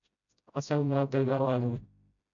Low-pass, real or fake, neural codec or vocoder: 7.2 kHz; fake; codec, 16 kHz, 0.5 kbps, FreqCodec, smaller model